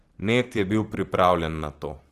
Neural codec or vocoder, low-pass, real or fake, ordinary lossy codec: vocoder, 44.1 kHz, 128 mel bands every 512 samples, BigVGAN v2; 14.4 kHz; fake; Opus, 16 kbps